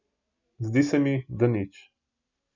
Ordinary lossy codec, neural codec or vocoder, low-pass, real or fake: none; none; 7.2 kHz; real